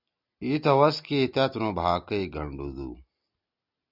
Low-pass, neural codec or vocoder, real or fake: 5.4 kHz; none; real